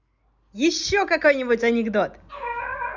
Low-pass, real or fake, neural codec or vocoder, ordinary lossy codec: 7.2 kHz; real; none; none